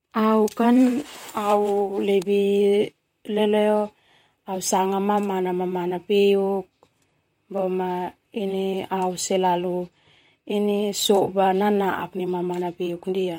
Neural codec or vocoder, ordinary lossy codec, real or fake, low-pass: vocoder, 44.1 kHz, 128 mel bands, Pupu-Vocoder; MP3, 64 kbps; fake; 19.8 kHz